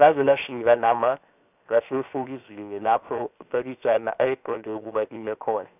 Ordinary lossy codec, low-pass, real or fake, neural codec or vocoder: none; 3.6 kHz; fake; codec, 16 kHz, 0.7 kbps, FocalCodec